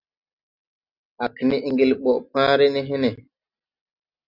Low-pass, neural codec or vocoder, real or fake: 5.4 kHz; none; real